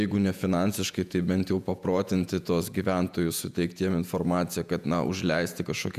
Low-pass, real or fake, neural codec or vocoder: 14.4 kHz; fake; vocoder, 48 kHz, 128 mel bands, Vocos